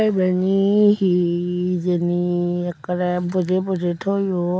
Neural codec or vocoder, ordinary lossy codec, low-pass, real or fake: none; none; none; real